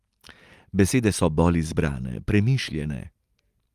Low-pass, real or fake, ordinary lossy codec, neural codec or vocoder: 14.4 kHz; real; Opus, 32 kbps; none